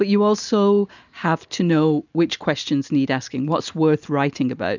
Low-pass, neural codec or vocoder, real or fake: 7.2 kHz; none; real